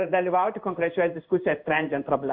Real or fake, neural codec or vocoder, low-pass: fake; codec, 16 kHz in and 24 kHz out, 1 kbps, XY-Tokenizer; 5.4 kHz